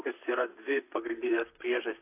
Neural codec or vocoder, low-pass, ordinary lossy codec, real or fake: vocoder, 44.1 kHz, 128 mel bands, Pupu-Vocoder; 3.6 kHz; AAC, 24 kbps; fake